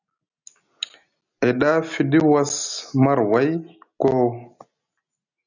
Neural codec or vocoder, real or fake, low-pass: none; real; 7.2 kHz